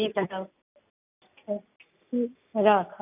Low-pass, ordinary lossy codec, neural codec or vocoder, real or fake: 3.6 kHz; none; none; real